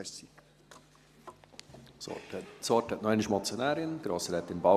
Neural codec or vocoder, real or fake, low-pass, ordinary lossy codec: none; real; 14.4 kHz; Opus, 64 kbps